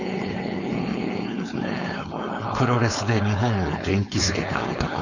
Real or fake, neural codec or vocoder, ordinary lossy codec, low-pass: fake; codec, 16 kHz, 4.8 kbps, FACodec; none; 7.2 kHz